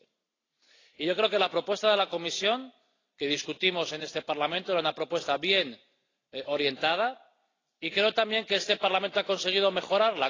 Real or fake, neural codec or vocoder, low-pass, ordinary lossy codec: real; none; 7.2 kHz; AAC, 32 kbps